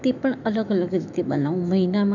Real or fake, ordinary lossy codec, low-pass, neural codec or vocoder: fake; none; 7.2 kHz; autoencoder, 48 kHz, 128 numbers a frame, DAC-VAE, trained on Japanese speech